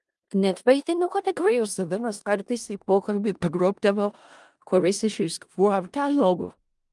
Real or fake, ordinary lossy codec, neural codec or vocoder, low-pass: fake; Opus, 32 kbps; codec, 16 kHz in and 24 kHz out, 0.4 kbps, LongCat-Audio-Codec, four codebook decoder; 10.8 kHz